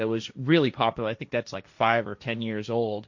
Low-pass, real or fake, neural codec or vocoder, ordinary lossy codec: 7.2 kHz; fake; codec, 16 kHz, 1.1 kbps, Voila-Tokenizer; MP3, 48 kbps